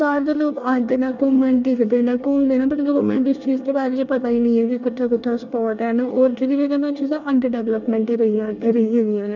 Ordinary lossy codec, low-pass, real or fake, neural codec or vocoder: none; 7.2 kHz; fake; codec, 24 kHz, 1 kbps, SNAC